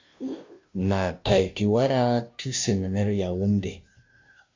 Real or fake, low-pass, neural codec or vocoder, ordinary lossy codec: fake; 7.2 kHz; codec, 16 kHz, 0.5 kbps, FunCodec, trained on Chinese and English, 25 frames a second; MP3, 64 kbps